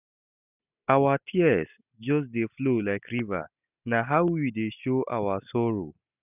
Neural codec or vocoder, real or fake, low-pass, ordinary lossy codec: none; real; 3.6 kHz; none